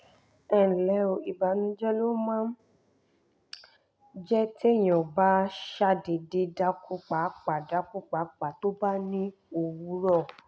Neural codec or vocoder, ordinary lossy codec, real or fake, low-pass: none; none; real; none